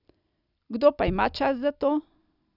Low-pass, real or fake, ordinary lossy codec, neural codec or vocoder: 5.4 kHz; real; none; none